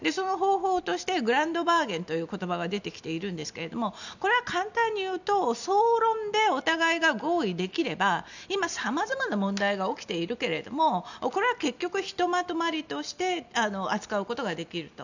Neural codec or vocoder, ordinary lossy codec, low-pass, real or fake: none; none; 7.2 kHz; real